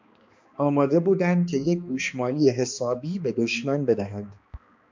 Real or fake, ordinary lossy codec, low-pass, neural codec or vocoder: fake; MP3, 64 kbps; 7.2 kHz; codec, 16 kHz, 2 kbps, X-Codec, HuBERT features, trained on balanced general audio